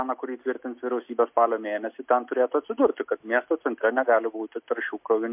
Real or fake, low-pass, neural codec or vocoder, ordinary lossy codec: real; 3.6 kHz; none; MP3, 32 kbps